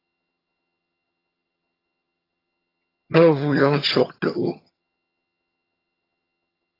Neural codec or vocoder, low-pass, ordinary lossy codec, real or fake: vocoder, 22.05 kHz, 80 mel bands, HiFi-GAN; 5.4 kHz; AAC, 24 kbps; fake